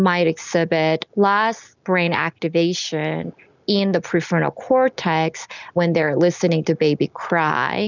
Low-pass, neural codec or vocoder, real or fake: 7.2 kHz; none; real